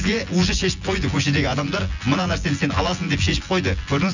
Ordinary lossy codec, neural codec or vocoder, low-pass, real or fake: none; vocoder, 24 kHz, 100 mel bands, Vocos; 7.2 kHz; fake